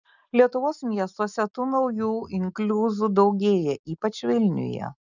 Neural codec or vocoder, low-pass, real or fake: none; 7.2 kHz; real